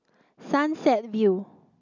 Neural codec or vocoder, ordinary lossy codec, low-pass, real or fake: none; none; 7.2 kHz; real